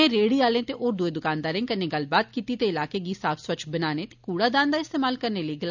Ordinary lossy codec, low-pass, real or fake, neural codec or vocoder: none; 7.2 kHz; real; none